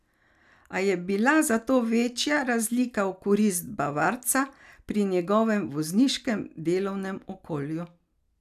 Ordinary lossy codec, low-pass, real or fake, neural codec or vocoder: none; 14.4 kHz; real; none